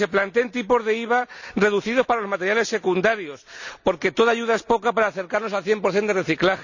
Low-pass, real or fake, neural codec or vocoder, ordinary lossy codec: 7.2 kHz; real; none; none